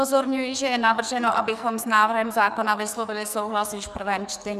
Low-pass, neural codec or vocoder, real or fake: 14.4 kHz; codec, 44.1 kHz, 2.6 kbps, SNAC; fake